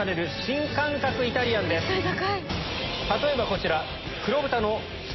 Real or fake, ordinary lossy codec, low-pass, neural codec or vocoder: real; MP3, 24 kbps; 7.2 kHz; none